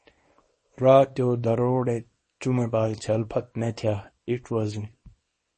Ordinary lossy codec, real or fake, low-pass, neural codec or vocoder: MP3, 32 kbps; fake; 10.8 kHz; codec, 24 kHz, 0.9 kbps, WavTokenizer, small release